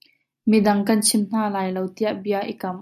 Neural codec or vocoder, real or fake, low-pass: none; real; 14.4 kHz